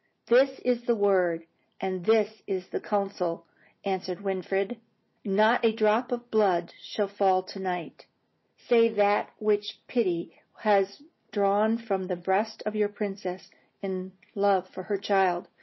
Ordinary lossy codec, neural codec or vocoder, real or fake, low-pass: MP3, 24 kbps; none; real; 7.2 kHz